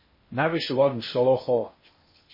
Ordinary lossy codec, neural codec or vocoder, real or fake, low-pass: MP3, 24 kbps; codec, 16 kHz in and 24 kHz out, 0.8 kbps, FocalCodec, streaming, 65536 codes; fake; 5.4 kHz